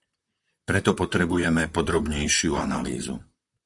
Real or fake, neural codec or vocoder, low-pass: fake; vocoder, 44.1 kHz, 128 mel bands, Pupu-Vocoder; 10.8 kHz